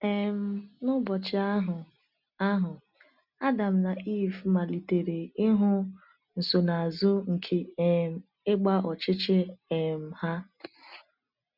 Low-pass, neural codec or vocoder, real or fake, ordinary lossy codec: 5.4 kHz; none; real; none